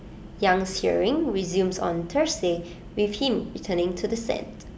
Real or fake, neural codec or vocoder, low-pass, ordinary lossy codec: real; none; none; none